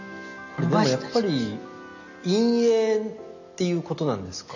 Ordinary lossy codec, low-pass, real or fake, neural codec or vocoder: none; 7.2 kHz; real; none